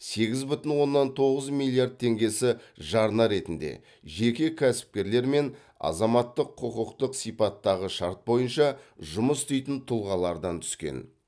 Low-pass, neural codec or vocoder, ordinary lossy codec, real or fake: none; none; none; real